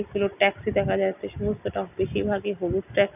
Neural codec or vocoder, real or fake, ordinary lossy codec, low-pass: none; real; none; 3.6 kHz